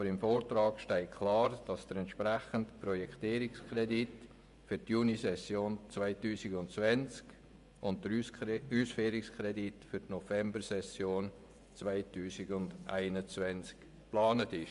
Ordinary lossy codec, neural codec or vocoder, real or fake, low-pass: none; vocoder, 24 kHz, 100 mel bands, Vocos; fake; 10.8 kHz